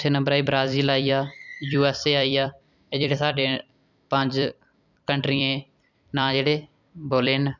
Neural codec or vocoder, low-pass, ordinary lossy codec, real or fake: vocoder, 44.1 kHz, 128 mel bands every 256 samples, BigVGAN v2; 7.2 kHz; none; fake